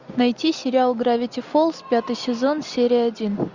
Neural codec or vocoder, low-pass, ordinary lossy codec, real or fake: none; 7.2 kHz; Opus, 64 kbps; real